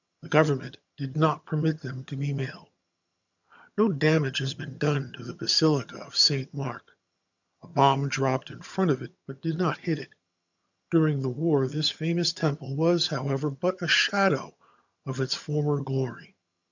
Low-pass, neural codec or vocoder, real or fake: 7.2 kHz; vocoder, 22.05 kHz, 80 mel bands, HiFi-GAN; fake